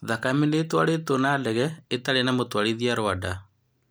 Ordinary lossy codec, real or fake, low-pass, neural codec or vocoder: none; real; none; none